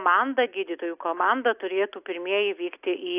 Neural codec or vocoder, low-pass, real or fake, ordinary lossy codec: none; 3.6 kHz; real; AAC, 32 kbps